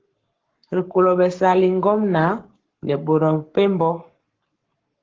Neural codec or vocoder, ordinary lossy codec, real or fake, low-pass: codec, 44.1 kHz, 7.8 kbps, Pupu-Codec; Opus, 16 kbps; fake; 7.2 kHz